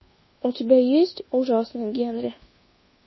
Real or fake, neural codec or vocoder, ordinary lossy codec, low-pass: fake; codec, 24 kHz, 1.2 kbps, DualCodec; MP3, 24 kbps; 7.2 kHz